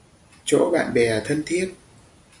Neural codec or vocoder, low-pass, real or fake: none; 10.8 kHz; real